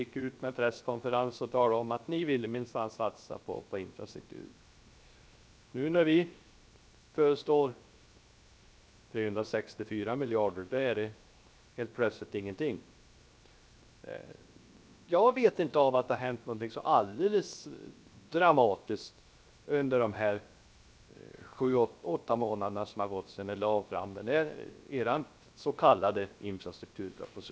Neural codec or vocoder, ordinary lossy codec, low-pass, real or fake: codec, 16 kHz, 0.7 kbps, FocalCodec; none; none; fake